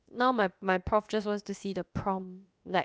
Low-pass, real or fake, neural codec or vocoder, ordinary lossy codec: none; fake; codec, 16 kHz, about 1 kbps, DyCAST, with the encoder's durations; none